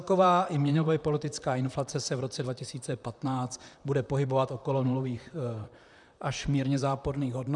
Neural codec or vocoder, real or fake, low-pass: vocoder, 44.1 kHz, 128 mel bands, Pupu-Vocoder; fake; 10.8 kHz